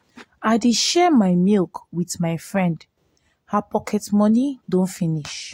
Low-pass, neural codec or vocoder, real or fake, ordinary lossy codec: 19.8 kHz; none; real; AAC, 48 kbps